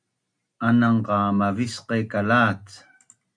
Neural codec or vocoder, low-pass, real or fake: none; 9.9 kHz; real